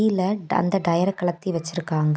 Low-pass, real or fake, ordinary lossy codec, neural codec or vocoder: none; real; none; none